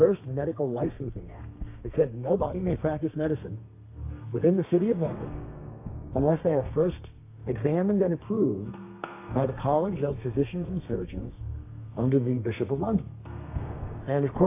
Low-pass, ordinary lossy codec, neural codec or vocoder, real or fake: 3.6 kHz; AAC, 24 kbps; codec, 32 kHz, 1.9 kbps, SNAC; fake